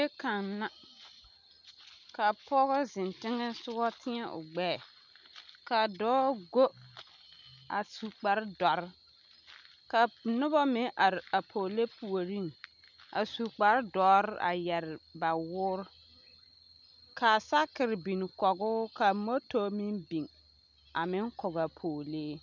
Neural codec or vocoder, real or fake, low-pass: none; real; 7.2 kHz